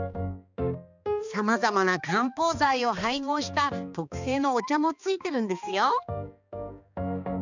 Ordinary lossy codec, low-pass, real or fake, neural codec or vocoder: none; 7.2 kHz; fake; codec, 16 kHz, 2 kbps, X-Codec, HuBERT features, trained on balanced general audio